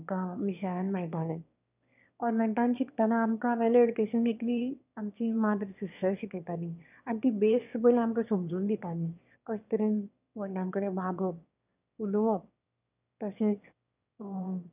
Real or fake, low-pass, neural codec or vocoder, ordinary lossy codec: fake; 3.6 kHz; autoencoder, 22.05 kHz, a latent of 192 numbers a frame, VITS, trained on one speaker; none